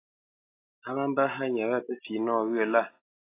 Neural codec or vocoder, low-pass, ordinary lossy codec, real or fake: none; 3.6 kHz; AAC, 24 kbps; real